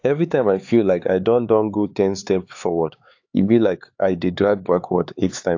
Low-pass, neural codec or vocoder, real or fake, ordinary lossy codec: 7.2 kHz; codec, 16 kHz, 4 kbps, X-Codec, HuBERT features, trained on LibriSpeech; fake; AAC, 48 kbps